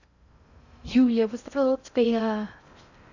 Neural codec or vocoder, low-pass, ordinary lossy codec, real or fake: codec, 16 kHz in and 24 kHz out, 0.6 kbps, FocalCodec, streaming, 2048 codes; 7.2 kHz; none; fake